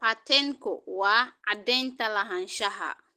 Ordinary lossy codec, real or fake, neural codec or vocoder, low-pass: Opus, 16 kbps; real; none; 14.4 kHz